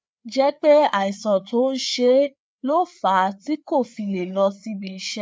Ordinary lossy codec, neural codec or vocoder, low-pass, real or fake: none; codec, 16 kHz, 4 kbps, FreqCodec, larger model; none; fake